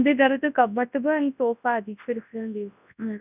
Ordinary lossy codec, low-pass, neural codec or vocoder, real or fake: none; 3.6 kHz; codec, 24 kHz, 0.9 kbps, WavTokenizer, large speech release; fake